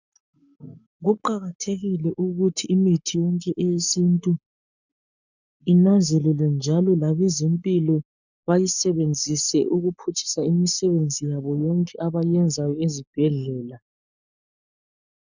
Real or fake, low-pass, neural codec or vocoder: fake; 7.2 kHz; codec, 44.1 kHz, 7.8 kbps, Pupu-Codec